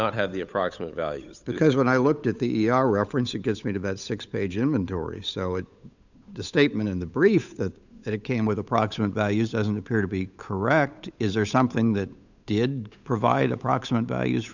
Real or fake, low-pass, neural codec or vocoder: fake; 7.2 kHz; codec, 16 kHz, 8 kbps, FunCodec, trained on Chinese and English, 25 frames a second